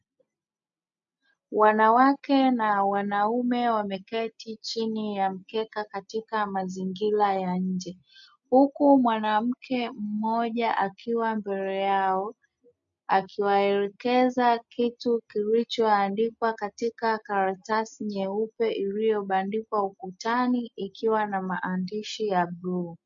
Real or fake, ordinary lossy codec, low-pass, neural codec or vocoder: real; MP3, 48 kbps; 7.2 kHz; none